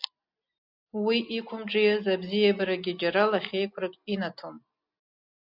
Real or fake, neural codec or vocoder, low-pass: real; none; 5.4 kHz